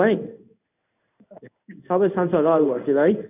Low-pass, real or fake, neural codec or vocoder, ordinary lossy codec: 3.6 kHz; fake; codec, 16 kHz in and 24 kHz out, 1 kbps, XY-Tokenizer; none